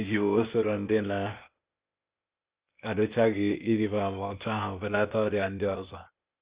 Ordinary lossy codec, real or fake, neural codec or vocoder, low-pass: Opus, 64 kbps; fake; codec, 16 kHz, 0.8 kbps, ZipCodec; 3.6 kHz